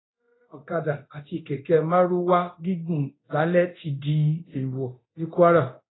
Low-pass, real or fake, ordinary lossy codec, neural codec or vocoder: 7.2 kHz; fake; AAC, 16 kbps; codec, 24 kHz, 0.9 kbps, DualCodec